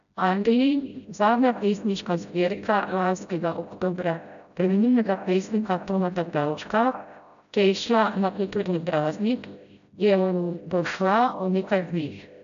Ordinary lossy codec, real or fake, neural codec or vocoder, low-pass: none; fake; codec, 16 kHz, 0.5 kbps, FreqCodec, smaller model; 7.2 kHz